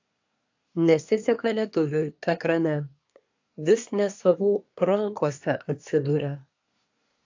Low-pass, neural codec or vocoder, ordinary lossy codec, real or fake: 7.2 kHz; codec, 24 kHz, 1 kbps, SNAC; AAC, 48 kbps; fake